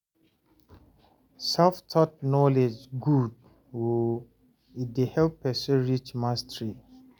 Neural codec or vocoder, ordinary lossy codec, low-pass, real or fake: none; none; 19.8 kHz; real